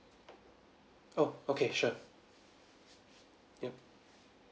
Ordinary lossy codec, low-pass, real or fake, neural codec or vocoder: none; none; real; none